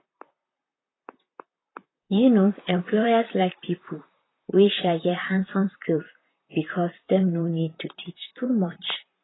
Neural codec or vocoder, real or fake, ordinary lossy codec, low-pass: vocoder, 44.1 kHz, 128 mel bands, Pupu-Vocoder; fake; AAC, 16 kbps; 7.2 kHz